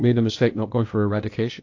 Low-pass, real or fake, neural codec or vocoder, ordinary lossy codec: 7.2 kHz; fake; codec, 16 kHz, 0.8 kbps, ZipCodec; AAC, 48 kbps